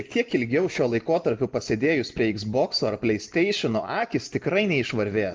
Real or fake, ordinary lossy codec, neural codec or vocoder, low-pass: real; Opus, 32 kbps; none; 7.2 kHz